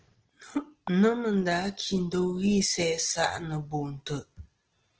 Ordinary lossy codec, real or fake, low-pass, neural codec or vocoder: Opus, 16 kbps; real; 7.2 kHz; none